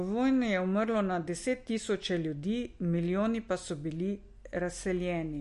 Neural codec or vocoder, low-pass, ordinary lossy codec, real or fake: none; 14.4 kHz; MP3, 48 kbps; real